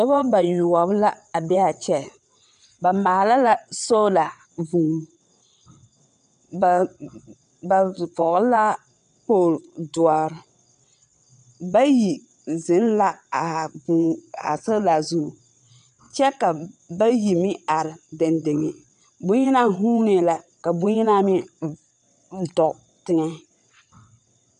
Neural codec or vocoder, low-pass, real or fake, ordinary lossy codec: vocoder, 22.05 kHz, 80 mel bands, WaveNeXt; 9.9 kHz; fake; AAC, 96 kbps